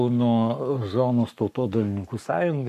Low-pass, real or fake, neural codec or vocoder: 14.4 kHz; fake; codec, 44.1 kHz, 7.8 kbps, Pupu-Codec